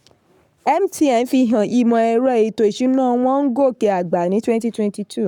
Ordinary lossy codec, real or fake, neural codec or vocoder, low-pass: none; fake; codec, 44.1 kHz, 7.8 kbps, Pupu-Codec; 19.8 kHz